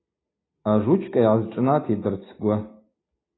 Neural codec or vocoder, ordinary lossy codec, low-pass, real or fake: none; AAC, 16 kbps; 7.2 kHz; real